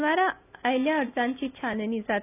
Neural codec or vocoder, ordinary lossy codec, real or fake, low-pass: none; none; real; 3.6 kHz